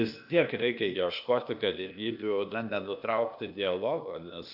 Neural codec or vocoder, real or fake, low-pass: codec, 16 kHz, 0.8 kbps, ZipCodec; fake; 5.4 kHz